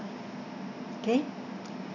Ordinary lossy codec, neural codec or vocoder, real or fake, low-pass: none; none; real; 7.2 kHz